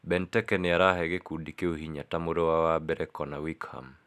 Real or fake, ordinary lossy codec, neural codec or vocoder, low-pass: real; none; none; 14.4 kHz